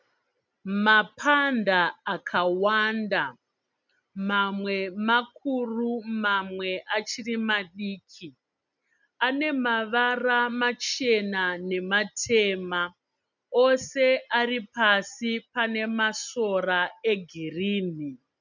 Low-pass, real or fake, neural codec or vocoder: 7.2 kHz; real; none